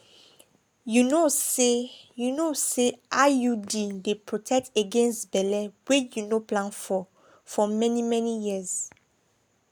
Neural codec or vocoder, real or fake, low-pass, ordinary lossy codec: none; real; none; none